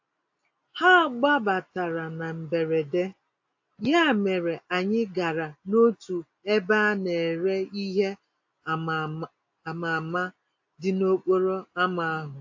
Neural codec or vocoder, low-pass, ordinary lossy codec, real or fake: none; 7.2 kHz; AAC, 48 kbps; real